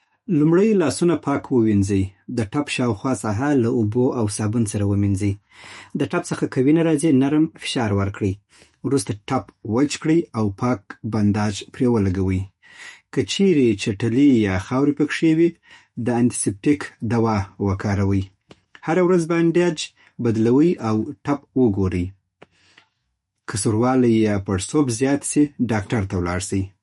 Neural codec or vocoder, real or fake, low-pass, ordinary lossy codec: autoencoder, 48 kHz, 128 numbers a frame, DAC-VAE, trained on Japanese speech; fake; 19.8 kHz; MP3, 48 kbps